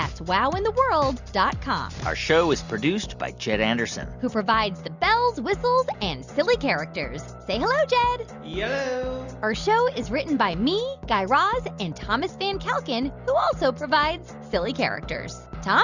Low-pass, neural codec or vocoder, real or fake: 7.2 kHz; none; real